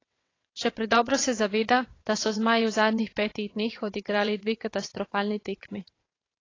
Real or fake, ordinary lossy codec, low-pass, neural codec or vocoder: fake; AAC, 32 kbps; 7.2 kHz; vocoder, 22.05 kHz, 80 mel bands, WaveNeXt